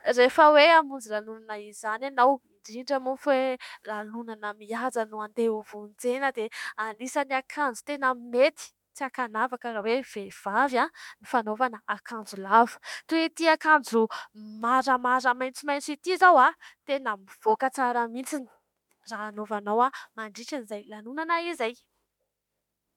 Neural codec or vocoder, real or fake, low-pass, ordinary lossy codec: autoencoder, 48 kHz, 32 numbers a frame, DAC-VAE, trained on Japanese speech; fake; 19.8 kHz; MP3, 96 kbps